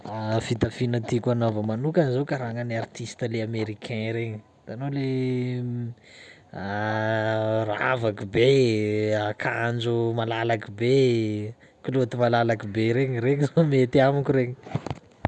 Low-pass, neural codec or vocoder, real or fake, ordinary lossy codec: none; none; real; none